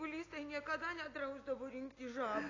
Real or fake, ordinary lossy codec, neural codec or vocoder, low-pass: real; AAC, 32 kbps; none; 7.2 kHz